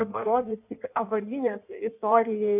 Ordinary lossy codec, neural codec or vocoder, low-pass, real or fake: AAC, 32 kbps; codec, 16 kHz in and 24 kHz out, 1.1 kbps, FireRedTTS-2 codec; 3.6 kHz; fake